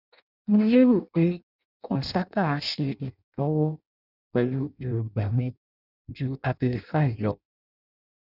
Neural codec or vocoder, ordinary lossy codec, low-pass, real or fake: codec, 16 kHz in and 24 kHz out, 0.6 kbps, FireRedTTS-2 codec; none; 5.4 kHz; fake